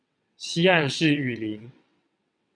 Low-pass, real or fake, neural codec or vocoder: 9.9 kHz; fake; vocoder, 22.05 kHz, 80 mel bands, WaveNeXt